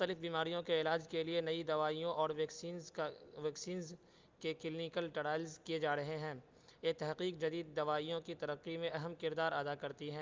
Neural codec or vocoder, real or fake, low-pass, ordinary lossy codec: none; real; 7.2 kHz; Opus, 24 kbps